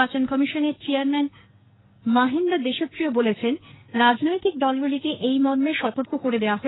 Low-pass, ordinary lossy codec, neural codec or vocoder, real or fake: 7.2 kHz; AAC, 16 kbps; codec, 44.1 kHz, 2.6 kbps, SNAC; fake